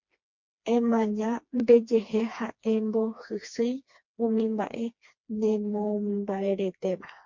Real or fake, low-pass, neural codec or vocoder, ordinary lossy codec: fake; 7.2 kHz; codec, 16 kHz, 2 kbps, FreqCodec, smaller model; MP3, 48 kbps